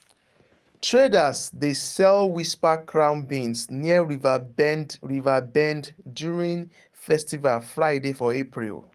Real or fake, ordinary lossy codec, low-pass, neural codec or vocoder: fake; Opus, 32 kbps; 14.4 kHz; codec, 44.1 kHz, 7.8 kbps, DAC